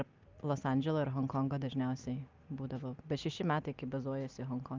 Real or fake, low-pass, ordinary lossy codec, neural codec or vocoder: real; 7.2 kHz; Opus, 24 kbps; none